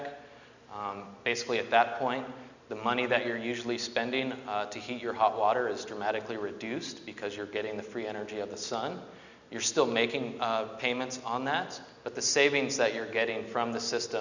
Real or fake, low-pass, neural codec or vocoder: real; 7.2 kHz; none